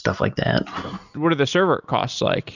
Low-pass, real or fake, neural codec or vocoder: 7.2 kHz; real; none